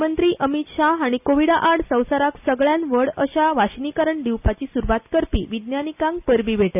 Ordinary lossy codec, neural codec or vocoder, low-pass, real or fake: none; none; 3.6 kHz; real